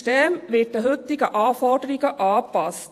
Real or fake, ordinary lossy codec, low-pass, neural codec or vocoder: fake; AAC, 64 kbps; 14.4 kHz; vocoder, 48 kHz, 128 mel bands, Vocos